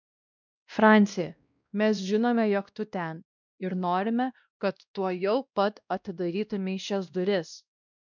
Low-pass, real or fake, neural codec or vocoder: 7.2 kHz; fake; codec, 16 kHz, 1 kbps, X-Codec, WavLM features, trained on Multilingual LibriSpeech